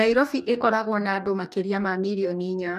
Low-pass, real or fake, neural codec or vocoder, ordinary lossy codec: 14.4 kHz; fake; codec, 44.1 kHz, 2.6 kbps, DAC; none